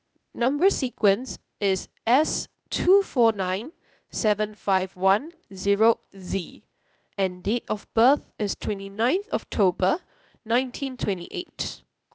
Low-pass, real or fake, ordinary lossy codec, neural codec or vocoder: none; fake; none; codec, 16 kHz, 0.8 kbps, ZipCodec